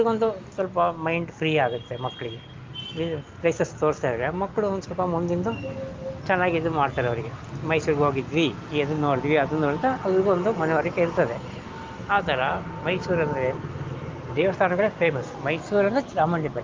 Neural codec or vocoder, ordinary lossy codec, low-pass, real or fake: none; Opus, 32 kbps; 7.2 kHz; real